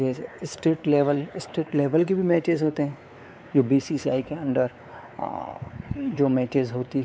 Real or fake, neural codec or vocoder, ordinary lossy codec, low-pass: fake; codec, 16 kHz, 4 kbps, X-Codec, WavLM features, trained on Multilingual LibriSpeech; none; none